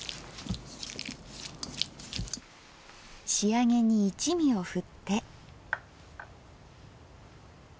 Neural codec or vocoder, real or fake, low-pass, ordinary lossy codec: none; real; none; none